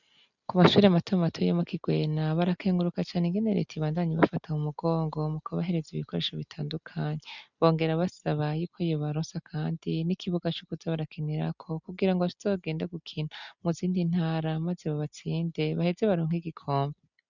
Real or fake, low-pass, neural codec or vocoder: real; 7.2 kHz; none